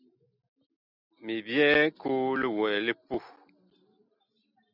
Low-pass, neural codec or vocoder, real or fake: 5.4 kHz; none; real